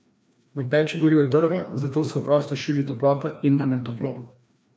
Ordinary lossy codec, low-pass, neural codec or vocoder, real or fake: none; none; codec, 16 kHz, 1 kbps, FreqCodec, larger model; fake